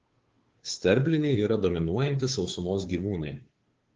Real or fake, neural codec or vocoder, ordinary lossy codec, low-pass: fake; codec, 16 kHz, 2 kbps, FunCodec, trained on Chinese and English, 25 frames a second; Opus, 16 kbps; 7.2 kHz